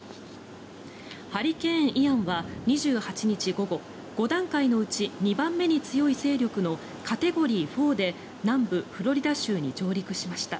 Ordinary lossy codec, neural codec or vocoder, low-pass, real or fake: none; none; none; real